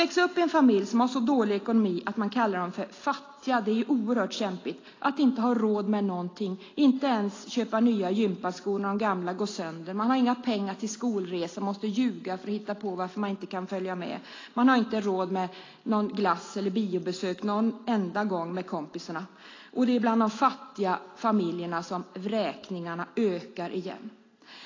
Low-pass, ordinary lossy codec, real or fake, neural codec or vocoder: 7.2 kHz; AAC, 32 kbps; real; none